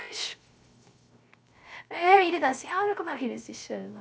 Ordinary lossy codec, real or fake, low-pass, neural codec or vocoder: none; fake; none; codec, 16 kHz, 0.3 kbps, FocalCodec